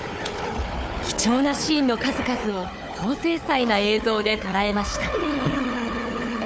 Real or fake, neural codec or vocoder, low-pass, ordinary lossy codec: fake; codec, 16 kHz, 4 kbps, FunCodec, trained on Chinese and English, 50 frames a second; none; none